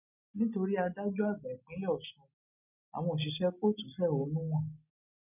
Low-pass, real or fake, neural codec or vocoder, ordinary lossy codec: 3.6 kHz; real; none; none